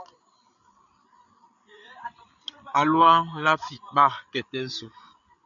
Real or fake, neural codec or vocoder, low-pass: fake; codec, 16 kHz, 8 kbps, FreqCodec, larger model; 7.2 kHz